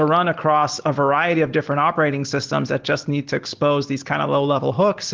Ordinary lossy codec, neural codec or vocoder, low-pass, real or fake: Opus, 16 kbps; none; 7.2 kHz; real